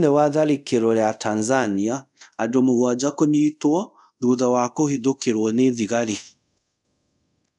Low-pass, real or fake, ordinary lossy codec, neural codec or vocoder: 10.8 kHz; fake; none; codec, 24 kHz, 0.5 kbps, DualCodec